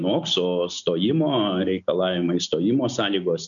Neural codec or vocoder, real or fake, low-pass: none; real; 7.2 kHz